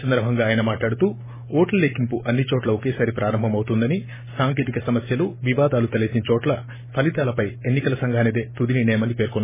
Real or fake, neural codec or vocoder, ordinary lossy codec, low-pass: real; none; MP3, 16 kbps; 3.6 kHz